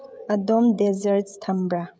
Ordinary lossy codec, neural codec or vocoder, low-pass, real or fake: none; codec, 16 kHz, 16 kbps, FreqCodec, smaller model; none; fake